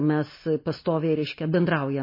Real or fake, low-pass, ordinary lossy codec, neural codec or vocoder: real; 5.4 kHz; MP3, 24 kbps; none